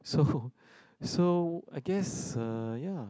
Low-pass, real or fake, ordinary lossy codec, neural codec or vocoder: none; real; none; none